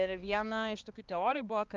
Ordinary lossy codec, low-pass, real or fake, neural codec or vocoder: Opus, 32 kbps; 7.2 kHz; fake; codec, 16 kHz, 2 kbps, X-Codec, HuBERT features, trained on balanced general audio